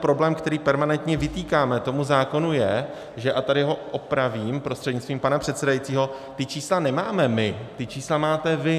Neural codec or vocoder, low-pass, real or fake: none; 14.4 kHz; real